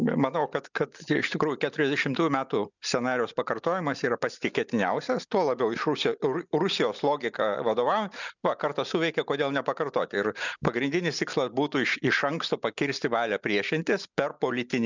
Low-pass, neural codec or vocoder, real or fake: 7.2 kHz; none; real